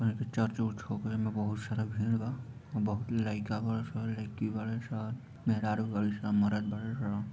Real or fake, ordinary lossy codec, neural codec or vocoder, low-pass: real; none; none; none